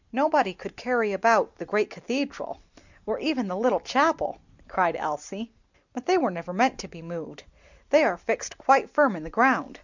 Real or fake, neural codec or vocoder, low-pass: real; none; 7.2 kHz